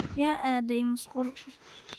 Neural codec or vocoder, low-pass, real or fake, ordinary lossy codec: autoencoder, 48 kHz, 32 numbers a frame, DAC-VAE, trained on Japanese speech; 14.4 kHz; fake; Opus, 32 kbps